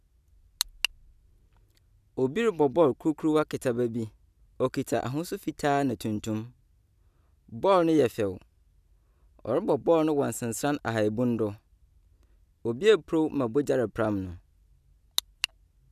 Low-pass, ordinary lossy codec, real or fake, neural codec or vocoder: 14.4 kHz; none; real; none